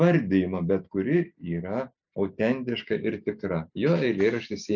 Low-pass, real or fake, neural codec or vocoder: 7.2 kHz; real; none